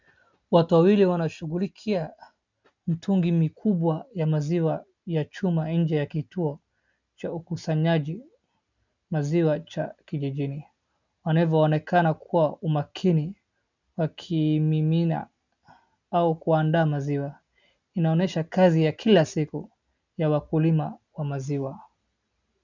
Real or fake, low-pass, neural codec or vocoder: real; 7.2 kHz; none